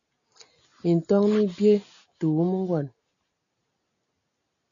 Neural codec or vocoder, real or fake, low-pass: none; real; 7.2 kHz